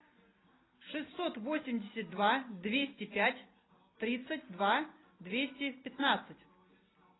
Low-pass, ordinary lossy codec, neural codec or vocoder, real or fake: 7.2 kHz; AAC, 16 kbps; none; real